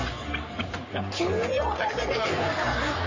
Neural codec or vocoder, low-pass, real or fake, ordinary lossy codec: codec, 44.1 kHz, 3.4 kbps, Pupu-Codec; 7.2 kHz; fake; MP3, 32 kbps